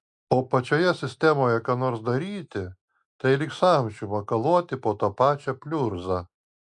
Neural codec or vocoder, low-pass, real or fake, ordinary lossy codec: none; 10.8 kHz; real; MP3, 96 kbps